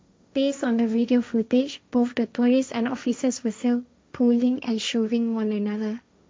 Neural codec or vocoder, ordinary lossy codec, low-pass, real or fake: codec, 16 kHz, 1.1 kbps, Voila-Tokenizer; none; none; fake